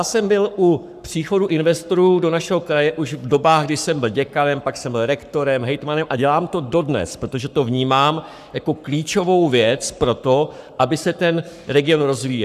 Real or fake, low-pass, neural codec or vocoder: fake; 14.4 kHz; codec, 44.1 kHz, 7.8 kbps, Pupu-Codec